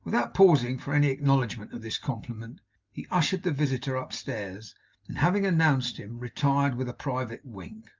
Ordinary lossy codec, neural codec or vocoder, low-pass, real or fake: Opus, 24 kbps; none; 7.2 kHz; real